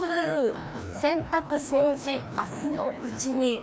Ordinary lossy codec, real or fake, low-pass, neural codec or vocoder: none; fake; none; codec, 16 kHz, 1 kbps, FreqCodec, larger model